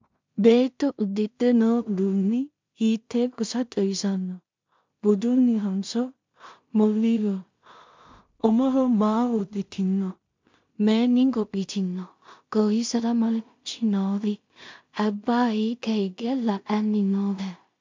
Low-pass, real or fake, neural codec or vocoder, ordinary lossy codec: 7.2 kHz; fake; codec, 16 kHz in and 24 kHz out, 0.4 kbps, LongCat-Audio-Codec, two codebook decoder; MP3, 64 kbps